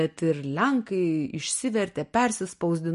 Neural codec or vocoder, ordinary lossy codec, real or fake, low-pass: none; MP3, 48 kbps; real; 14.4 kHz